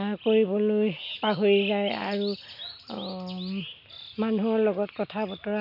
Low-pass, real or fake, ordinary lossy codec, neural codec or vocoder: 5.4 kHz; real; none; none